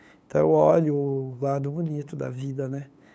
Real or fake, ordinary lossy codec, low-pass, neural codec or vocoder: fake; none; none; codec, 16 kHz, 8 kbps, FunCodec, trained on LibriTTS, 25 frames a second